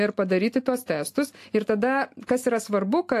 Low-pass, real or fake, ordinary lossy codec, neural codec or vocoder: 14.4 kHz; real; AAC, 64 kbps; none